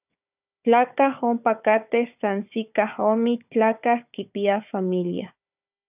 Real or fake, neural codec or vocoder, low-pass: fake; codec, 16 kHz, 4 kbps, FunCodec, trained on Chinese and English, 50 frames a second; 3.6 kHz